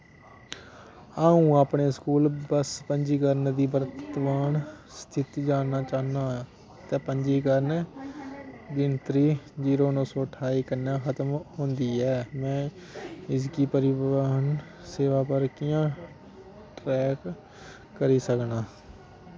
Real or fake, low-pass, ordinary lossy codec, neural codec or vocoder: real; none; none; none